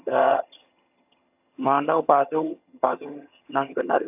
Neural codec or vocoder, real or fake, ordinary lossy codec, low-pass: vocoder, 22.05 kHz, 80 mel bands, HiFi-GAN; fake; AAC, 32 kbps; 3.6 kHz